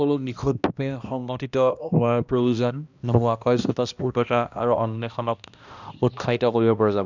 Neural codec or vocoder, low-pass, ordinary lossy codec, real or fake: codec, 16 kHz, 1 kbps, X-Codec, HuBERT features, trained on balanced general audio; 7.2 kHz; none; fake